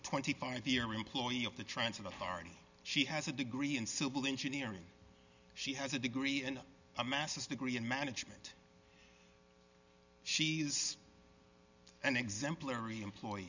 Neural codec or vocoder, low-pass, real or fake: none; 7.2 kHz; real